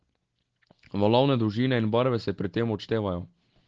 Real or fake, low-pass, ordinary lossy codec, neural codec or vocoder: real; 7.2 kHz; Opus, 16 kbps; none